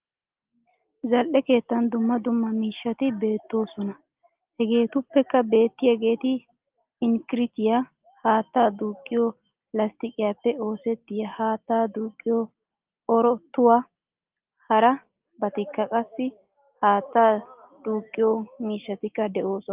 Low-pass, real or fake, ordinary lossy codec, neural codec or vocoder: 3.6 kHz; real; Opus, 32 kbps; none